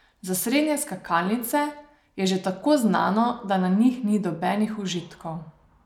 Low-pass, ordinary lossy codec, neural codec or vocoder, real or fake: 19.8 kHz; none; none; real